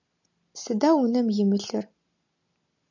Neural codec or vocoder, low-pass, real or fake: none; 7.2 kHz; real